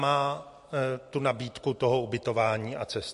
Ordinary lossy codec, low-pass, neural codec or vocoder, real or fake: MP3, 48 kbps; 14.4 kHz; none; real